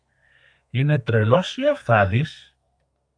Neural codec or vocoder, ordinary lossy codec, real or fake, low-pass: codec, 32 kHz, 1.9 kbps, SNAC; AAC, 64 kbps; fake; 9.9 kHz